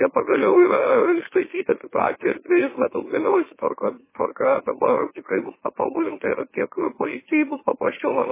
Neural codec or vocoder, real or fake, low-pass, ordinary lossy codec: autoencoder, 44.1 kHz, a latent of 192 numbers a frame, MeloTTS; fake; 3.6 kHz; MP3, 16 kbps